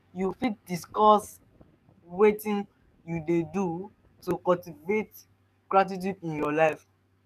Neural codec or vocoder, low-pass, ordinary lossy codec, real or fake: codec, 44.1 kHz, 7.8 kbps, DAC; 14.4 kHz; none; fake